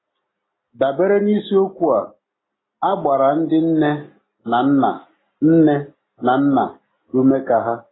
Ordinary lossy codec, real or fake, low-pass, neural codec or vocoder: AAC, 16 kbps; real; 7.2 kHz; none